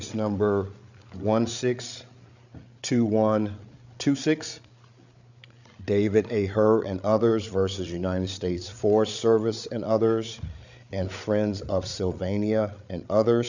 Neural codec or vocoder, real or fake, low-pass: codec, 16 kHz, 8 kbps, FreqCodec, larger model; fake; 7.2 kHz